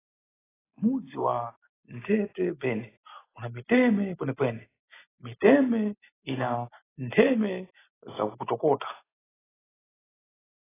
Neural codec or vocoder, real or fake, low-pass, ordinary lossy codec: none; real; 3.6 kHz; AAC, 16 kbps